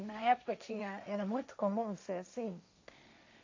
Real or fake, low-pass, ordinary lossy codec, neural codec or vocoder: fake; 7.2 kHz; MP3, 48 kbps; codec, 16 kHz, 1.1 kbps, Voila-Tokenizer